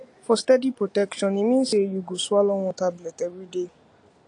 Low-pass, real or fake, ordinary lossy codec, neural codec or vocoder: 9.9 kHz; real; AAC, 48 kbps; none